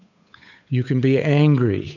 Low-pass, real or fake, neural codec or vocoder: 7.2 kHz; fake; codec, 16 kHz, 8 kbps, FunCodec, trained on Chinese and English, 25 frames a second